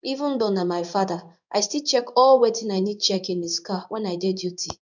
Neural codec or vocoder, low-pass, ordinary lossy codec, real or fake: codec, 16 kHz in and 24 kHz out, 1 kbps, XY-Tokenizer; 7.2 kHz; none; fake